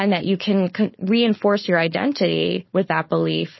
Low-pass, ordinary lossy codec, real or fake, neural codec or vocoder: 7.2 kHz; MP3, 24 kbps; fake; codec, 16 kHz, 4.8 kbps, FACodec